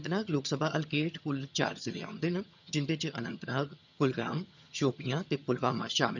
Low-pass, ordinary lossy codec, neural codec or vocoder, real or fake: 7.2 kHz; none; vocoder, 22.05 kHz, 80 mel bands, HiFi-GAN; fake